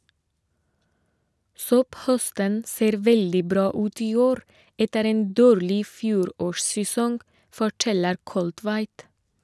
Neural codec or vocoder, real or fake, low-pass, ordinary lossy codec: none; real; none; none